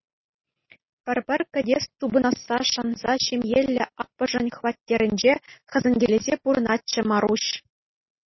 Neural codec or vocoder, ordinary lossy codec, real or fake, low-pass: none; MP3, 24 kbps; real; 7.2 kHz